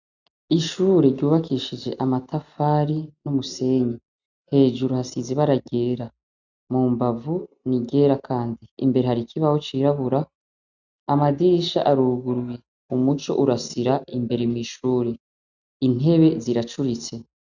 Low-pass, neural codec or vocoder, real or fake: 7.2 kHz; none; real